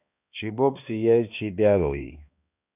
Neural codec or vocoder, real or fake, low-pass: codec, 16 kHz, 2 kbps, X-Codec, HuBERT features, trained on balanced general audio; fake; 3.6 kHz